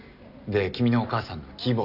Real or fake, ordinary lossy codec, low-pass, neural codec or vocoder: real; none; 5.4 kHz; none